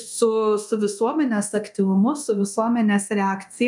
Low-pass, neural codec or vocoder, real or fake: 10.8 kHz; codec, 24 kHz, 0.9 kbps, DualCodec; fake